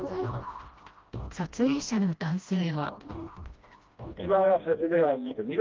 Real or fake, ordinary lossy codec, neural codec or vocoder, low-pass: fake; Opus, 32 kbps; codec, 16 kHz, 1 kbps, FreqCodec, smaller model; 7.2 kHz